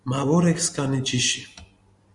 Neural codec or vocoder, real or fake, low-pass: none; real; 10.8 kHz